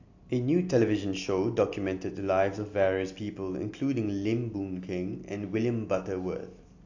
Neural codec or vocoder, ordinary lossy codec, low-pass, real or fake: none; AAC, 48 kbps; 7.2 kHz; real